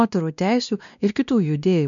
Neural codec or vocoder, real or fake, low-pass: codec, 16 kHz, 1 kbps, X-Codec, WavLM features, trained on Multilingual LibriSpeech; fake; 7.2 kHz